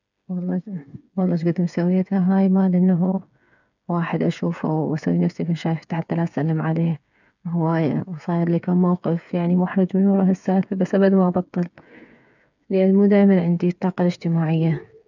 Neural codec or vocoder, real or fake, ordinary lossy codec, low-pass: codec, 16 kHz, 8 kbps, FreqCodec, smaller model; fake; none; 7.2 kHz